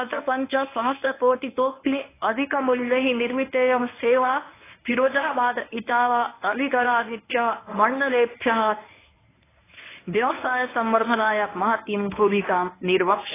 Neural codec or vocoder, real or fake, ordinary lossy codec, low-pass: codec, 24 kHz, 0.9 kbps, WavTokenizer, medium speech release version 1; fake; AAC, 16 kbps; 3.6 kHz